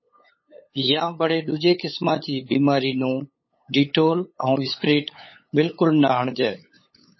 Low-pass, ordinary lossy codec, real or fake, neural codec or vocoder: 7.2 kHz; MP3, 24 kbps; fake; codec, 16 kHz, 8 kbps, FunCodec, trained on LibriTTS, 25 frames a second